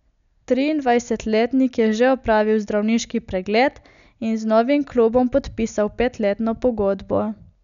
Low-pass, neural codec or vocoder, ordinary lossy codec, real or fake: 7.2 kHz; none; none; real